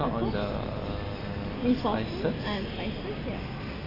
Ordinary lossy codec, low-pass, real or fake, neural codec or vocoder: AAC, 24 kbps; 5.4 kHz; real; none